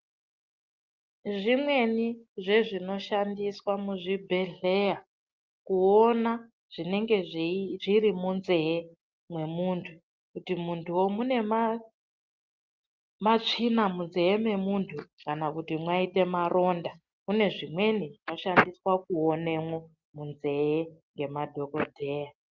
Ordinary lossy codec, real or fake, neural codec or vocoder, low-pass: Opus, 32 kbps; real; none; 7.2 kHz